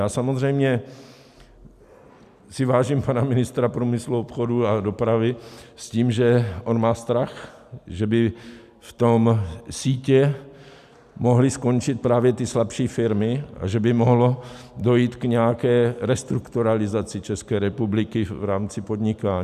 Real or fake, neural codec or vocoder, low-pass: real; none; 14.4 kHz